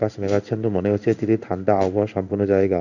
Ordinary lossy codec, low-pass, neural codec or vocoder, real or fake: none; 7.2 kHz; codec, 16 kHz in and 24 kHz out, 1 kbps, XY-Tokenizer; fake